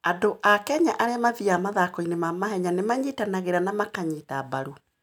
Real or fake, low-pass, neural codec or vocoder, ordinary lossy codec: real; 19.8 kHz; none; none